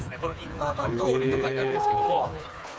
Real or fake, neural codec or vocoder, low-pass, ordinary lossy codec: fake; codec, 16 kHz, 4 kbps, FreqCodec, smaller model; none; none